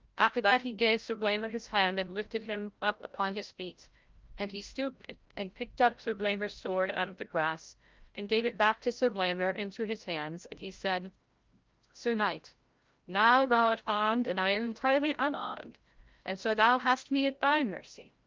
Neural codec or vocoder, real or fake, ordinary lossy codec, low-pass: codec, 16 kHz, 0.5 kbps, FreqCodec, larger model; fake; Opus, 32 kbps; 7.2 kHz